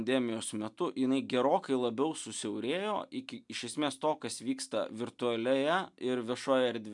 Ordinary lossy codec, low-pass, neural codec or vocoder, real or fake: MP3, 96 kbps; 10.8 kHz; none; real